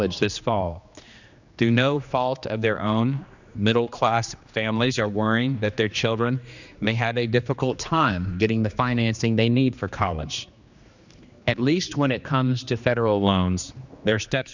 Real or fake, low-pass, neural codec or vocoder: fake; 7.2 kHz; codec, 16 kHz, 2 kbps, X-Codec, HuBERT features, trained on general audio